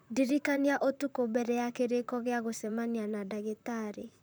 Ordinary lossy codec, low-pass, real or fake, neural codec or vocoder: none; none; fake; vocoder, 44.1 kHz, 128 mel bands, Pupu-Vocoder